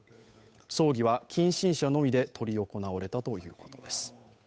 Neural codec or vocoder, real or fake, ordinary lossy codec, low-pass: codec, 16 kHz, 8 kbps, FunCodec, trained on Chinese and English, 25 frames a second; fake; none; none